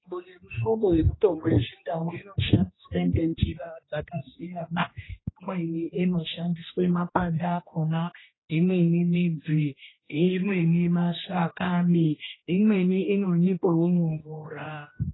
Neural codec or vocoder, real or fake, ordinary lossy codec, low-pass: codec, 16 kHz, 1 kbps, X-Codec, HuBERT features, trained on general audio; fake; AAC, 16 kbps; 7.2 kHz